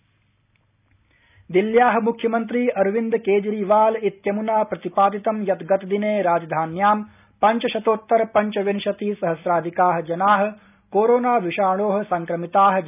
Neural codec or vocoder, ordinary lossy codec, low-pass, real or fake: none; none; 3.6 kHz; real